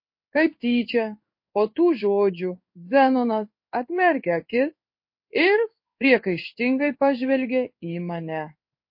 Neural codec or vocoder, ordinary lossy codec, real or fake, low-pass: codec, 16 kHz in and 24 kHz out, 1 kbps, XY-Tokenizer; MP3, 32 kbps; fake; 5.4 kHz